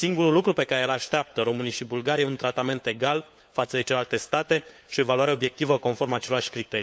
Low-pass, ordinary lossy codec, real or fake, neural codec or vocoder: none; none; fake; codec, 16 kHz, 4 kbps, FunCodec, trained on LibriTTS, 50 frames a second